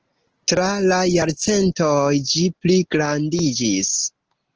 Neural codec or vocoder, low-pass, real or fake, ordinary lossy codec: none; 7.2 kHz; real; Opus, 16 kbps